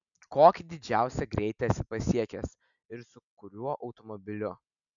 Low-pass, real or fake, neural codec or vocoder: 7.2 kHz; real; none